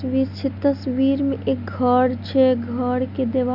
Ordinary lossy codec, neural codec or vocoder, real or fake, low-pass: none; none; real; 5.4 kHz